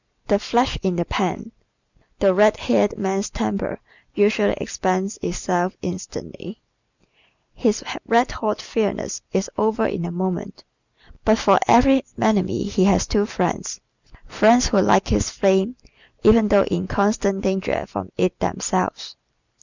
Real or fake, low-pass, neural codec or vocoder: real; 7.2 kHz; none